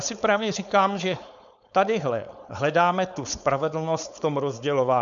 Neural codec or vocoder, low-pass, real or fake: codec, 16 kHz, 4.8 kbps, FACodec; 7.2 kHz; fake